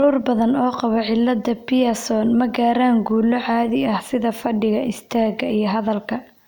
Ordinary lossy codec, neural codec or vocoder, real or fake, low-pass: none; none; real; none